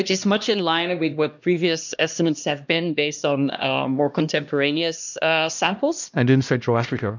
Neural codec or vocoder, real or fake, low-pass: codec, 16 kHz, 1 kbps, X-Codec, HuBERT features, trained on LibriSpeech; fake; 7.2 kHz